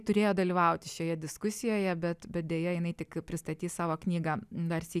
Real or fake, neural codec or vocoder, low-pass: real; none; 14.4 kHz